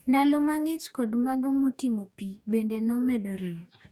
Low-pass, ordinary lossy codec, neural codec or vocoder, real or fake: 19.8 kHz; none; codec, 44.1 kHz, 2.6 kbps, DAC; fake